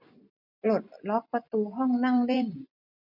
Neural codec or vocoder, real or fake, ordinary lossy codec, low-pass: vocoder, 44.1 kHz, 128 mel bands every 256 samples, BigVGAN v2; fake; none; 5.4 kHz